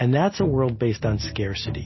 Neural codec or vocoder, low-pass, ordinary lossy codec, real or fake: none; 7.2 kHz; MP3, 24 kbps; real